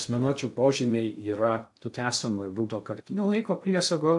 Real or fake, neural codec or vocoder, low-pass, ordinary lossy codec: fake; codec, 16 kHz in and 24 kHz out, 0.6 kbps, FocalCodec, streaming, 2048 codes; 10.8 kHz; AAC, 64 kbps